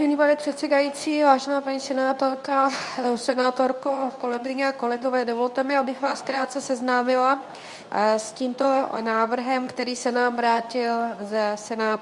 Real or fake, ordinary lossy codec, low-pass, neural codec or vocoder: fake; Opus, 64 kbps; 10.8 kHz; codec, 24 kHz, 0.9 kbps, WavTokenizer, medium speech release version 2